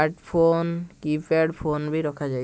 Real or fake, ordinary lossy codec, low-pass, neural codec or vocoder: real; none; none; none